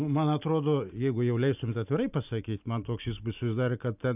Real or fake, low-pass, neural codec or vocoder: real; 3.6 kHz; none